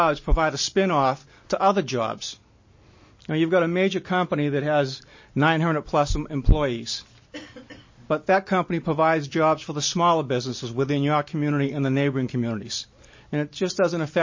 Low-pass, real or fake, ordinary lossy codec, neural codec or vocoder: 7.2 kHz; real; MP3, 32 kbps; none